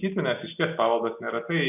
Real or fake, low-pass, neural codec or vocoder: real; 3.6 kHz; none